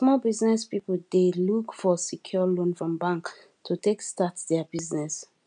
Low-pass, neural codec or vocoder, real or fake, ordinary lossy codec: 9.9 kHz; none; real; none